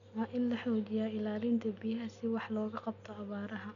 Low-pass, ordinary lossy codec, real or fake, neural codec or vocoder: 7.2 kHz; none; real; none